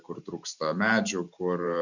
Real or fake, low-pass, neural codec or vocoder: fake; 7.2 kHz; vocoder, 44.1 kHz, 128 mel bands every 512 samples, BigVGAN v2